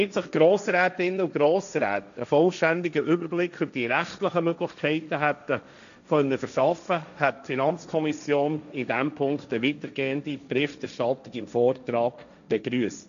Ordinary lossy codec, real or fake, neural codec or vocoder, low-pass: none; fake; codec, 16 kHz, 1.1 kbps, Voila-Tokenizer; 7.2 kHz